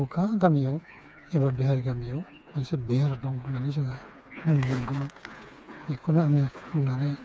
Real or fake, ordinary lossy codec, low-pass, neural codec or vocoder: fake; none; none; codec, 16 kHz, 4 kbps, FreqCodec, smaller model